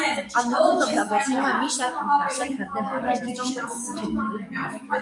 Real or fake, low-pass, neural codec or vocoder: fake; 10.8 kHz; codec, 44.1 kHz, 7.8 kbps, DAC